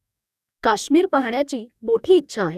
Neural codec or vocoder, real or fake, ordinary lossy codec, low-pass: codec, 44.1 kHz, 2.6 kbps, DAC; fake; none; 14.4 kHz